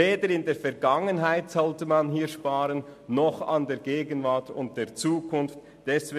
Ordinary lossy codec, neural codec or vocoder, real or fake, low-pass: MP3, 64 kbps; none; real; 14.4 kHz